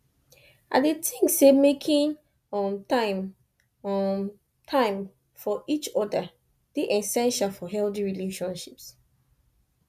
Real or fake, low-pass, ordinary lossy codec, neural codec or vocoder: real; 14.4 kHz; none; none